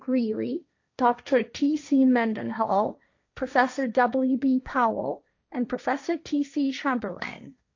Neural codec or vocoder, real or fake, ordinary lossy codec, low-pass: codec, 16 kHz, 1.1 kbps, Voila-Tokenizer; fake; AAC, 48 kbps; 7.2 kHz